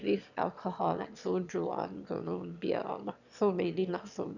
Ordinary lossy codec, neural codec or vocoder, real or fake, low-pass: none; autoencoder, 22.05 kHz, a latent of 192 numbers a frame, VITS, trained on one speaker; fake; 7.2 kHz